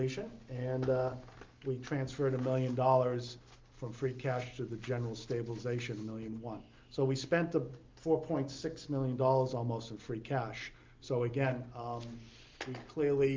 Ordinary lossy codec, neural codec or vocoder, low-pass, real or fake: Opus, 24 kbps; none; 7.2 kHz; real